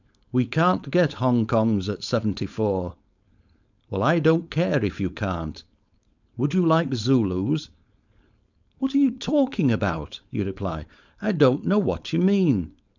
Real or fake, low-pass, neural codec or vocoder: fake; 7.2 kHz; codec, 16 kHz, 4.8 kbps, FACodec